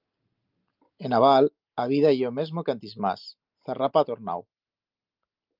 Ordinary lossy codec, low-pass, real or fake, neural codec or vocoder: Opus, 24 kbps; 5.4 kHz; real; none